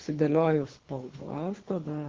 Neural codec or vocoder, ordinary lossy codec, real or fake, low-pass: codec, 24 kHz, 3 kbps, HILCodec; Opus, 16 kbps; fake; 7.2 kHz